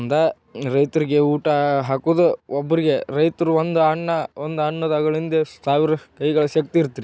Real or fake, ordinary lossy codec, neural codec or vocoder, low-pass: real; none; none; none